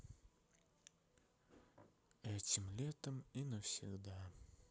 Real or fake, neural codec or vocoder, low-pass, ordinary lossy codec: real; none; none; none